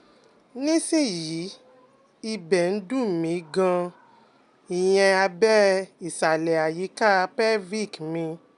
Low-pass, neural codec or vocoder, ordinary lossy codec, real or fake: 10.8 kHz; none; none; real